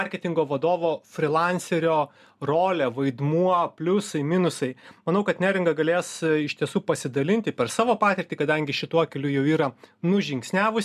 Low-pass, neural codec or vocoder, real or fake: 14.4 kHz; none; real